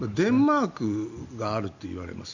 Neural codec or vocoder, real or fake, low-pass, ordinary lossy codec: none; real; 7.2 kHz; none